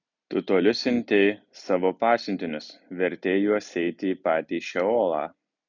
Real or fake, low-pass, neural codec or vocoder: real; 7.2 kHz; none